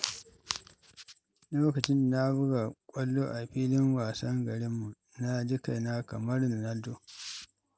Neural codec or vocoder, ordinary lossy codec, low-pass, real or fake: none; none; none; real